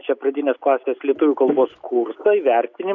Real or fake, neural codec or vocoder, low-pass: real; none; 7.2 kHz